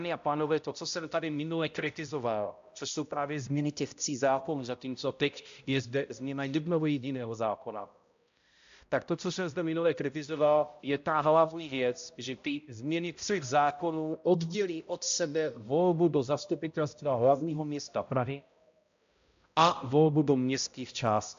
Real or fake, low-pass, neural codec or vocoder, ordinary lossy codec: fake; 7.2 kHz; codec, 16 kHz, 0.5 kbps, X-Codec, HuBERT features, trained on balanced general audio; AAC, 64 kbps